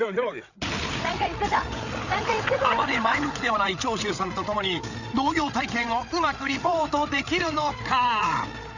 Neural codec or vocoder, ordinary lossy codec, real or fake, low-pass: codec, 16 kHz, 8 kbps, FreqCodec, larger model; none; fake; 7.2 kHz